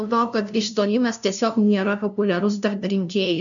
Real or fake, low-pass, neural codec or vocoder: fake; 7.2 kHz; codec, 16 kHz, 0.5 kbps, FunCodec, trained on LibriTTS, 25 frames a second